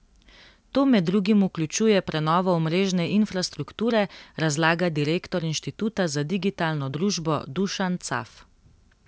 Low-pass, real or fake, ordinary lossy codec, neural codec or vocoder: none; real; none; none